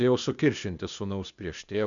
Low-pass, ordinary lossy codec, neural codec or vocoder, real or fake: 7.2 kHz; MP3, 96 kbps; codec, 16 kHz, 0.8 kbps, ZipCodec; fake